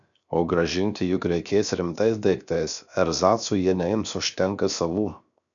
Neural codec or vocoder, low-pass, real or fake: codec, 16 kHz, 0.7 kbps, FocalCodec; 7.2 kHz; fake